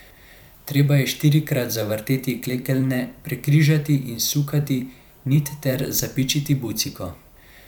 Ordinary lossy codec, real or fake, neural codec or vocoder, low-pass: none; fake; vocoder, 44.1 kHz, 128 mel bands every 512 samples, BigVGAN v2; none